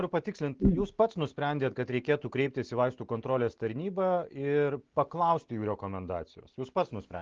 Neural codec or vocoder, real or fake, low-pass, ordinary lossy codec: none; real; 7.2 kHz; Opus, 16 kbps